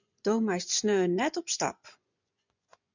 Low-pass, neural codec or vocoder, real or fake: 7.2 kHz; vocoder, 24 kHz, 100 mel bands, Vocos; fake